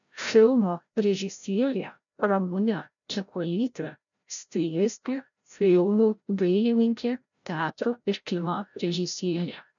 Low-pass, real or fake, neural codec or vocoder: 7.2 kHz; fake; codec, 16 kHz, 0.5 kbps, FreqCodec, larger model